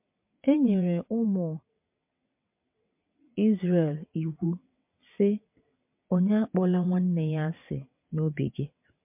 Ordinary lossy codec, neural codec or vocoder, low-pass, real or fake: MP3, 32 kbps; vocoder, 22.05 kHz, 80 mel bands, WaveNeXt; 3.6 kHz; fake